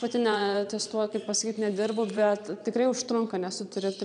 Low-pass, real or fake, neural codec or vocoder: 9.9 kHz; fake; vocoder, 22.05 kHz, 80 mel bands, Vocos